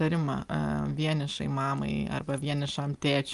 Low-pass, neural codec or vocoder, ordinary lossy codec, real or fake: 10.8 kHz; none; Opus, 32 kbps; real